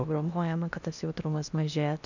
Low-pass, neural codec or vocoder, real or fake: 7.2 kHz; codec, 16 kHz in and 24 kHz out, 0.8 kbps, FocalCodec, streaming, 65536 codes; fake